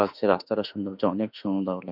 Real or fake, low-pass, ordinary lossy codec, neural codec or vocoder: fake; 5.4 kHz; none; autoencoder, 48 kHz, 32 numbers a frame, DAC-VAE, trained on Japanese speech